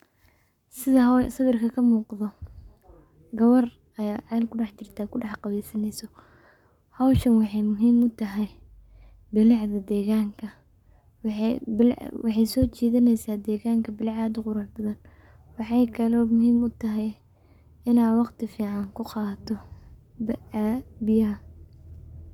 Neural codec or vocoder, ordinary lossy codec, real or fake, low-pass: codec, 44.1 kHz, 7.8 kbps, Pupu-Codec; none; fake; 19.8 kHz